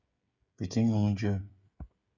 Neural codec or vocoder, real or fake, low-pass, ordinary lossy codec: codec, 16 kHz, 16 kbps, FreqCodec, smaller model; fake; 7.2 kHz; Opus, 64 kbps